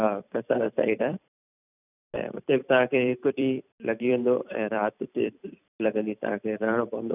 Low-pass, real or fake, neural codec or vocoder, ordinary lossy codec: 3.6 kHz; fake; vocoder, 44.1 kHz, 128 mel bands every 256 samples, BigVGAN v2; none